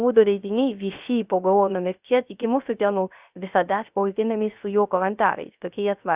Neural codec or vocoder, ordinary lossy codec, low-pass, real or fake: codec, 16 kHz, 0.3 kbps, FocalCodec; Opus, 64 kbps; 3.6 kHz; fake